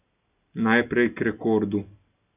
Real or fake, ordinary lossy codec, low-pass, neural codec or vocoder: real; none; 3.6 kHz; none